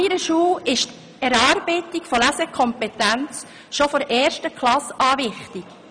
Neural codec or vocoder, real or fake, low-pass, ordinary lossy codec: none; real; 9.9 kHz; none